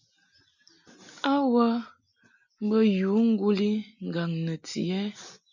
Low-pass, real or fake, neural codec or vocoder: 7.2 kHz; real; none